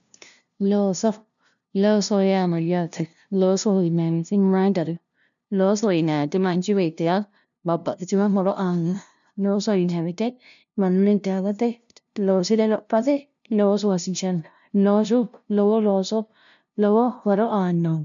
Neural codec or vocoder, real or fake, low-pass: codec, 16 kHz, 0.5 kbps, FunCodec, trained on LibriTTS, 25 frames a second; fake; 7.2 kHz